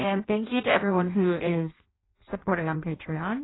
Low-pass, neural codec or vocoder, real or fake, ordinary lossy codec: 7.2 kHz; codec, 16 kHz in and 24 kHz out, 0.6 kbps, FireRedTTS-2 codec; fake; AAC, 16 kbps